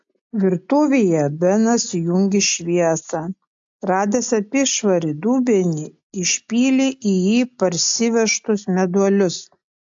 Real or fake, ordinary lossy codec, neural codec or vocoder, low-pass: real; AAC, 64 kbps; none; 7.2 kHz